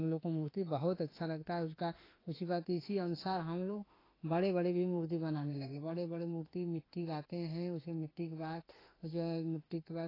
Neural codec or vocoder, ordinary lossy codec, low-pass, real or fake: autoencoder, 48 kHz, 32 numbers a frame, DAC-VAE, trained on Japanese speech; AAC, 24 kbps; 5.4 kHz; fake